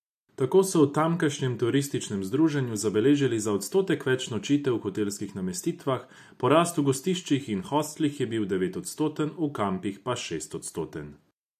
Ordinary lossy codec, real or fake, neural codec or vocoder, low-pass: AAC, 96 kbps; real; none; 14.4 kHz